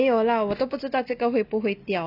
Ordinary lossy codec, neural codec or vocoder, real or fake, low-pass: none; none; real; 5.4 kHz